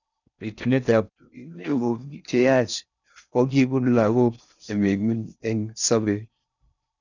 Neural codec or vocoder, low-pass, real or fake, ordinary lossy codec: codec, 16 kHz in and 24 kHz out, 0.6 kbps, FocalCodec, streaming, 4096 codes; 7.2 kHz; fake; none